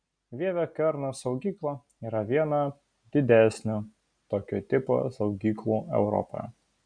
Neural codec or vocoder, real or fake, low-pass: none; real; 9.9 kHz